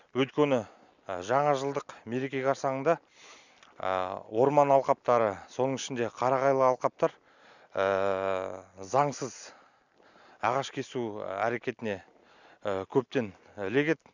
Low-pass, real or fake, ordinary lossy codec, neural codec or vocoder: 7.2 kHz; real; none; none